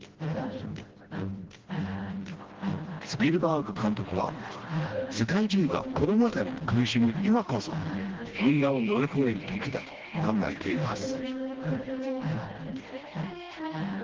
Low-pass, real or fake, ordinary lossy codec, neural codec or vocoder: 7.2 kHz; fake; Opus, 16 kbps; codec, 16 kHz, 1 kbps, FreqCodec, smaller model